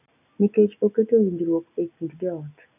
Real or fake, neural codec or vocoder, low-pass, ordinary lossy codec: real; none; 3.6 kHz; none